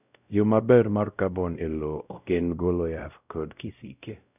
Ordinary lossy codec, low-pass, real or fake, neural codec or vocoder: none; 3.6 kHz; fake; codec, 16 kHz, 0.5 kbps, X-Codec, WavLM features, trained on Multilingual LibriSpeech